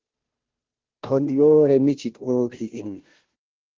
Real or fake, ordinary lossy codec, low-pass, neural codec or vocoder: fake; Opus, 16 kbps; 7.2 kHz; codec, 16 kHz, 0.5 kbps, FunCodec, trained on Chinese and English, 25 frames a second